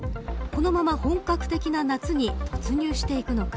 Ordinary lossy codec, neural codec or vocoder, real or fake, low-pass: none; none; real; none